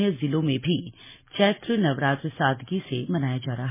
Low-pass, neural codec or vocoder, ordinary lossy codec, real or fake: 3.6 kHz; none; MP3, 16 kbps; real